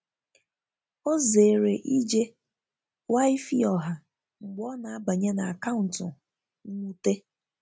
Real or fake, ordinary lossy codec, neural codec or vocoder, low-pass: real; none; none; none